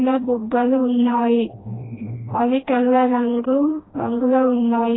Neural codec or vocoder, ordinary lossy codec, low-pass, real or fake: codec, 16 kHz, 1 kbps, FreqCodec, smaller model; AAC, 16 kbps; 7.2 kHz; fake